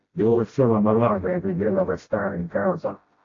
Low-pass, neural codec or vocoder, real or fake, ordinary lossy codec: 7.2 kHz; codec, 16 kHz, 0.5 kbps, FreqCodec, smaller model; fake; AAC, 32 kbps